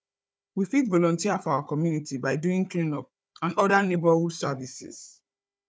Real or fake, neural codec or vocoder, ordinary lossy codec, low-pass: fake; codec, 16 kHz, 4 kbps, FunCodec, trained on Chinese and English, 50 frames a second; none; none